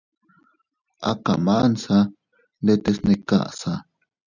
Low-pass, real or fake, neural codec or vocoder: 7.2 kHz; real; none